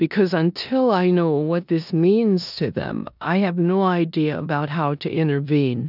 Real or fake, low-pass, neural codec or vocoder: fake; 5.4 kHz; codec, 16 kHz in and 24 kHz out, 0.9 kbps, LongCat-Audio-Codec, four codebook decoder